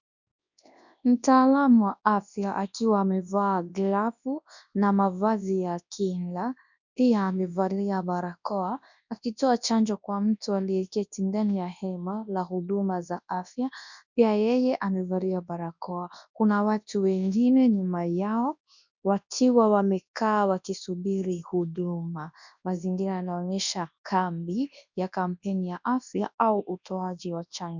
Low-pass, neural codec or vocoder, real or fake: 7.2 kHz; codec, 24 kHz, 0.9 kbps, WavTokenizer, large speech release; fake